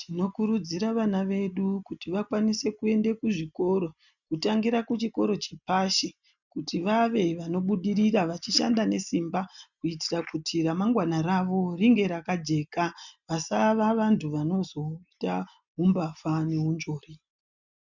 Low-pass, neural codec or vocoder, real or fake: 7.2 kHz; none; real